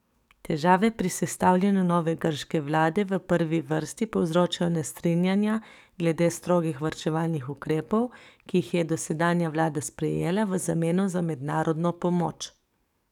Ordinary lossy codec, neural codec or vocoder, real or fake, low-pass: none; codec, 44.1 kHz, 7.8 kbps, DAC; fake; 19.8 kHz